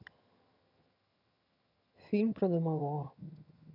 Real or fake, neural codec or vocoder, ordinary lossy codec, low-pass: fake; vocoder, 22.05 kHz, 80 mel bands, HiFi-GAN; none; 5.4 kHz